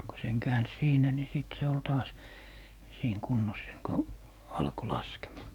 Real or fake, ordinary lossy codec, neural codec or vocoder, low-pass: fake; none; codec, 44.1 kHz, 7.8 kbps, DAC; 19.8 kHz